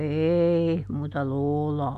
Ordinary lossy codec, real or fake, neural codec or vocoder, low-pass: none; real; none; 14.4 kHz